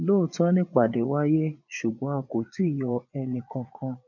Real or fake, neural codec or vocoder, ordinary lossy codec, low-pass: fake; vocoder, 22.05 kHz, 80 mel bands, Vocos; none; 7.2 kHz